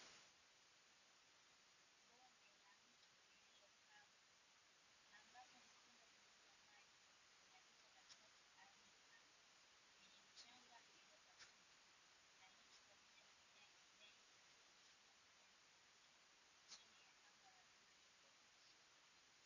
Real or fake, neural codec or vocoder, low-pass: real; none; 7.2 kHz